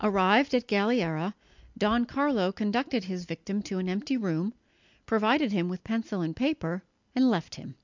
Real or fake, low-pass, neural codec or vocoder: real; 7.2 kHz; none